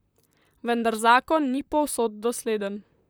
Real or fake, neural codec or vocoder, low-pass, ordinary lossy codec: fake; vocoder, 44.1 kHz, 128 mel bands, Pupu-Vocoder; none; none